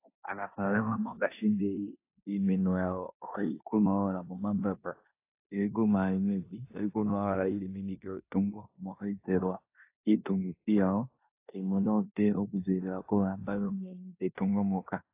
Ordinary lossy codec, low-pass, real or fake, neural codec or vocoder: AAC, 24 kbps; 3.6 kHz; fake; codec, 16 kHz in and 24 kHz out, 0.9 kbps, LongCat-Audio-Codec, four codebook decoder